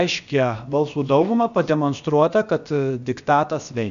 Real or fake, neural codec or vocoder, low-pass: fake; codec, 16 kHz, about 1 kbps, DyCAST, with the encoder's durations; 7.2 kHz